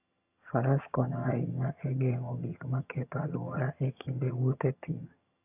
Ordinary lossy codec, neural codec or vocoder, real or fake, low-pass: AAC, 32 kbps; vocoder, 22.05 kHz, 80 mel bands, HiFi-GAN; fake; 3.6 kHz